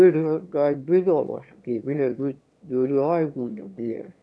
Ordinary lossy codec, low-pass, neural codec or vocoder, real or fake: none; none; autoencoder, 22.05 kHz, a latent of 192 numbers a frame, VITS, trained on one speaker; fake